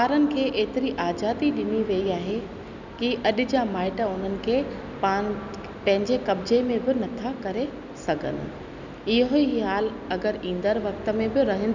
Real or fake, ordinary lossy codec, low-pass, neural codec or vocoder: real; none; 7.2 kHz; none